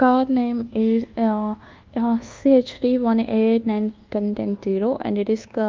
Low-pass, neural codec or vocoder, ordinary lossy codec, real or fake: 7.2 kHz; codec, 24 kHz, 1.2 kbps, DualCodec; Opus, 32 kbps; fake